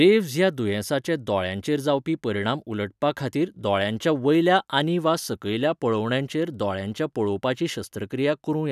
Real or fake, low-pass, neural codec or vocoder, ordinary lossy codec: real; 14.4 kHz; none; none